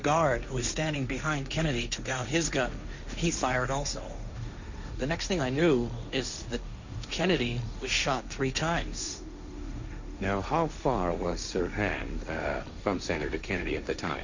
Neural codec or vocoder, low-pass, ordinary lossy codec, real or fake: codec, 16 kHz, 1.1 kbps, Voila-Tokenizer; 7.2 kHz; Opus, 64 kbps; fake